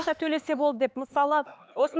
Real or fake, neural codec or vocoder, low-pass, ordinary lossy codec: fake; codec, 16 kHz, 4 kbps, X-Codec, HuBERT features, trained on LibriSpeech; none; none